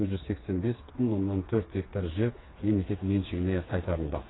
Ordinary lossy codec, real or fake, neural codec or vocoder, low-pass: AAC, 16 kbps; fake; codec, 16 kHz, 4 kbps, FreqCodec, smaller model; 7.2 kHz